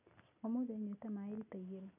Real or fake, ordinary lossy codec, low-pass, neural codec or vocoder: real; none; 3.6 kHz; none